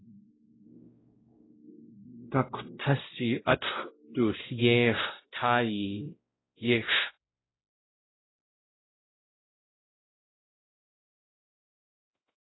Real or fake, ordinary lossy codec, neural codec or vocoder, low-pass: fake; AAC, 16 kbps; codec, 16 kHz, 0.5 kbps, X-Codec, WavLM features, trained on Multilingual LibriSpeech; 7.2 kHz